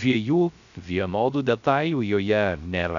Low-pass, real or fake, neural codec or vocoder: 7.2 kHz; fake; codec, 16 kHz, 0.3 kbps, FocalCodec